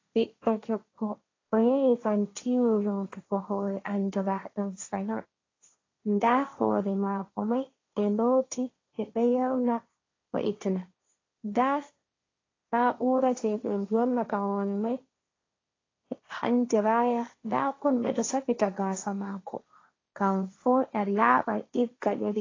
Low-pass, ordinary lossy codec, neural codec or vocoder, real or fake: 7.2 kHz; AAC, 32 kbps; codec, 16 kHz, 1.1 kbps, Voila-Tokenizer; fake